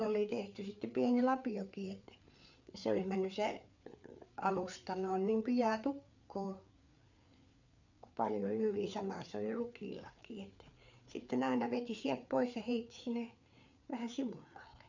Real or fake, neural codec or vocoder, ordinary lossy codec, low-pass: fake; codec, 16 kHz, 4 kbps, FreqCodec, larger model; none; 7.2 kHz